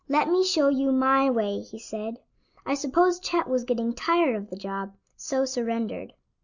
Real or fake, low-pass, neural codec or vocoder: real; 7.2 kHz; none